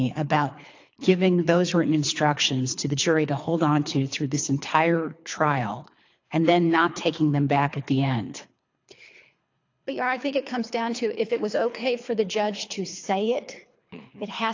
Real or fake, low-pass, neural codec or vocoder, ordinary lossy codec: fake; 7.2 kHz; codec, 24 kHz, 3 kbps, HILCodec; AAC, 48 kbps